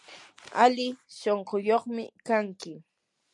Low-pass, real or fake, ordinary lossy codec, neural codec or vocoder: 10.8 kHz; real; MP3, 64 kbps; none